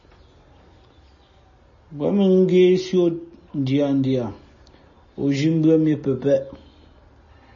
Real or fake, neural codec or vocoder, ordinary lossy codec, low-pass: real; none; MP3, 32 kbps; 7.2 kHz